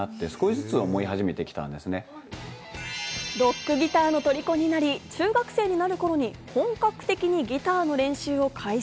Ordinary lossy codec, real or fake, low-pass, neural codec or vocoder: none; real; none; none